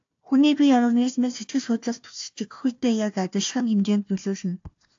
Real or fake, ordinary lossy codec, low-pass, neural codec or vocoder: fake; AAC, 48 kbps; 7.2 kHz; codec, 16 kHz, 1 kbps, FunCodec, trained on Chinese and English, 50 frames a second